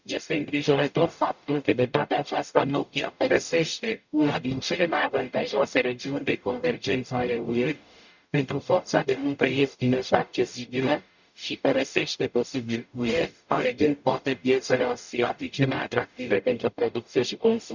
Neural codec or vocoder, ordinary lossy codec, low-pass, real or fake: codec, 44.1 kHz, 0.9 kbps, DAC; none; 7.2 kHz; fake